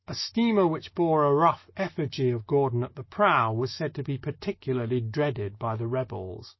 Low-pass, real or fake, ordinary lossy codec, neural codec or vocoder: 7.2 kHz; fake; MP3, 24 kbps; autoencoder, 48 kHz, 128 numbers a frame, DAC-VAE, trained on Japanese speech